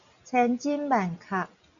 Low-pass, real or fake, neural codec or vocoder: 7.2 kHz; real; none